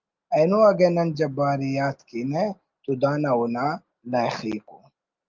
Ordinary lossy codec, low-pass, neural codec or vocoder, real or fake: Opus, 24 kbps; 7.2 kHz; none; real